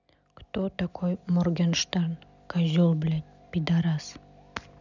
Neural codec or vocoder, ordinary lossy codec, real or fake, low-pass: none; none; real; 7.2 kHz